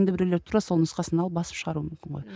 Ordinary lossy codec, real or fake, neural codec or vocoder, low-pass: none; real; none; none